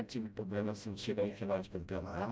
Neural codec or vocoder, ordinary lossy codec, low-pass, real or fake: codec, 16 kHz, 0.5 kbps, FreqCodec, smaller model; none; none; fake